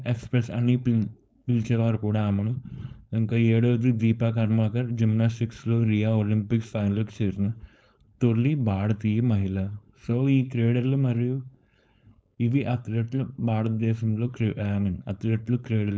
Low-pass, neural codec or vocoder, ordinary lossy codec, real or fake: none; codec, 16 kHz, 4.8 kbps, FACodec; none; fake